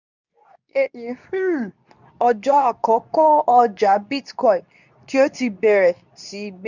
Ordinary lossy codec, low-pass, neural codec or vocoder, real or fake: none; 7.2 kHz; codec, 24 kHz, 0.9 kbps, WavTokenizer, medium speech release version 2; fake